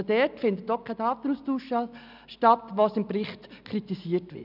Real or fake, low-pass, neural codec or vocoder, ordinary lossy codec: real; 5.4 kHz; none; none